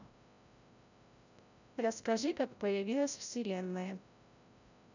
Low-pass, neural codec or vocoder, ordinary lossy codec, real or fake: 7.2 kHz; codec, 16 kHz, 0.5 kbps, FreqCodec, larger model; none; fake